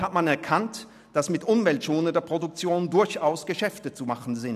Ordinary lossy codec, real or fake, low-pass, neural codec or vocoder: none; real; 14.4 kHz; none